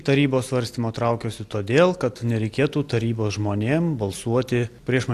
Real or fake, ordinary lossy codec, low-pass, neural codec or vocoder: real; AAC, 64 kbps; 14.4 kHz; none